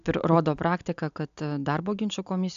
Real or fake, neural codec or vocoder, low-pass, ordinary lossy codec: real; none; 7.2 kHz; AAC, 96 kbps